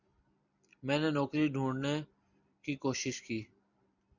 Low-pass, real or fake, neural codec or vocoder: 7.2 kHz; fake; vocoder, 44.1 kHz, 128 mel bands every 256 samples, BigVGAN v2